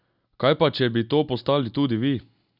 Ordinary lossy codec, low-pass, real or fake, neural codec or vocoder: none; 5.4 kHz; real; none